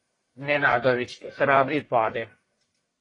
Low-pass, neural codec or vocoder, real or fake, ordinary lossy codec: 10.8 kHz; codec, 44.1 kHz, 1.7 kbps, Pupu-Codec; fake; AAC, 32 kbps